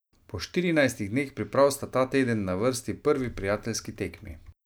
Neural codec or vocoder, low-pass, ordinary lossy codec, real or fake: none; none; none; real